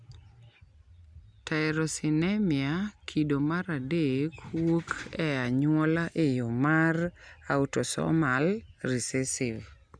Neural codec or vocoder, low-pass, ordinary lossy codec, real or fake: none; 9.9 kHz; Opus, 64 kbps; real